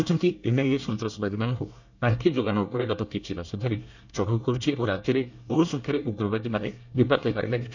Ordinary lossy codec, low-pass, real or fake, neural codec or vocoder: none; 7.2 kHz; fake; codec, 24 kHz, 1 kbps, SNAC